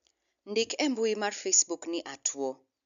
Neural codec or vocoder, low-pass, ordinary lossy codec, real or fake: none; 7.2 kHz; AAC, 96 kbps; real